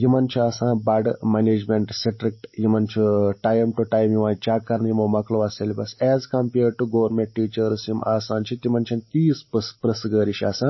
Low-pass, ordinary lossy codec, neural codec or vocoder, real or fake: 7.2 kHz; MP3, 24 kbps; none; real